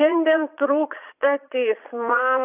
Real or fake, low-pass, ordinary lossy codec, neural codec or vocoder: fake; 3.6 kHz; AAC, 32 kbps; vocoder, 22.05 kHz, 80 mel bands, Vocos